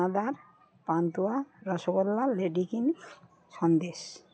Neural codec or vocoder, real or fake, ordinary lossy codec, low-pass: none; real; none; none